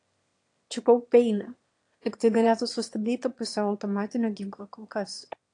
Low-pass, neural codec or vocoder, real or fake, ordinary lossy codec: 9.9 kHz; autoencoder, 22.05 kHz, a latent of 192 numbers a frame, VITS, trained on one speaker; fake; AAC, 48 kbps